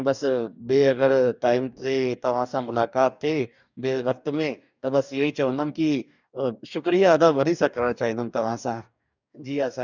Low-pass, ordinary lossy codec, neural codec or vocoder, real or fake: 7.2 kHz; none; codec, 44.1 kHz, 2.6 kbps, DAC; fake